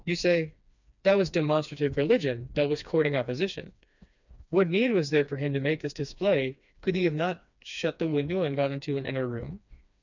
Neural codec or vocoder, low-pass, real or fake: codec, 16 kHz, 2 kbps, FreqCodec, smaller model; 7.2 kHz; fake